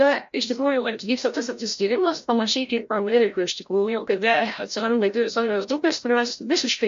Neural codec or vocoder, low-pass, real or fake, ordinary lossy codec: codec, 16 kHz, 0.5 kbps, FreqCodec, larger model; 7.2 kHz; fake; MP3, 48 kbps